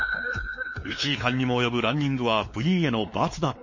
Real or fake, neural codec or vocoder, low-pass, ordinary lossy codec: fake; codec, 16 kHz, 4 kbps, X-Codec, WavLM features, trained on Multilingual LibriSpeech; 7.2 kHz; MP3, 32 kbps